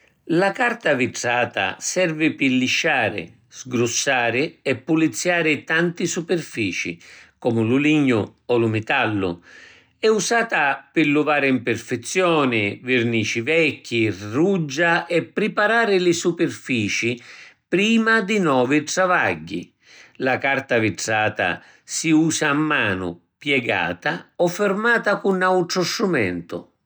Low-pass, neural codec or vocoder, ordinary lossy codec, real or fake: none; none; none; real